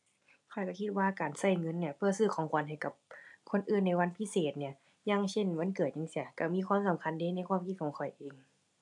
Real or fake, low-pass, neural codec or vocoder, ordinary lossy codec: real; 10.8 kHz; none; AAC, 64 kbps